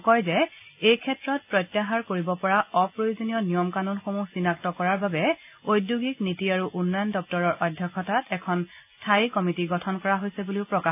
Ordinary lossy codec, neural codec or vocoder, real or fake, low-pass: AAC, 32 kbps; none; real; 3.6 kHz